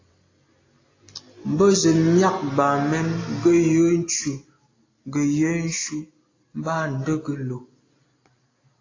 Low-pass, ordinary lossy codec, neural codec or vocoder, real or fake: 7.2 kHz; AAC, 32 kbps; none; real